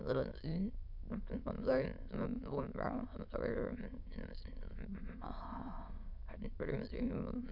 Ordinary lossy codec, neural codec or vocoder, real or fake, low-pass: MP3, 48 kbps; autoencoder, 22.05 kHz, a latent of 192 numbers a frame, VITS, trained on many speakers; fake; 5.4 kHz